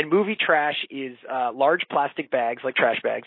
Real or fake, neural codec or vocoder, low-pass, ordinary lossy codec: real; none; 5.4 kHz; MP3, 24 kbps